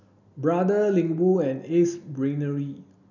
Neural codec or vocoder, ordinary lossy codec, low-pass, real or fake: none; none; 7.2 kHz; real